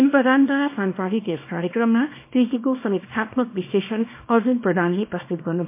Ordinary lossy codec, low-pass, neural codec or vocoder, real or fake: MP3, 24 kbps; 3.6 kHz; codec, 24 kHz, 0.9 kbps, WavTokenizer, small release; fake